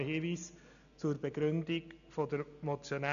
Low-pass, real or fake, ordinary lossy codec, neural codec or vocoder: 7.2 kHz; real; none; none